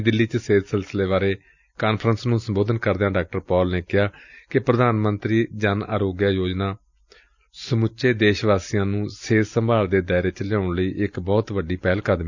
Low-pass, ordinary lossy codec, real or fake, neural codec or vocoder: 7.2 kHz; none; real; none